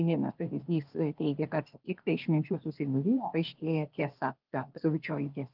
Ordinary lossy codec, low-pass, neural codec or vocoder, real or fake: Opus, 32 kbps; 5.4 kHz; codec, 16 kHz, 0.8 kbps, ZipCodec; fake